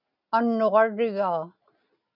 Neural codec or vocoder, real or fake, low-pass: none; real; 5.4 kHz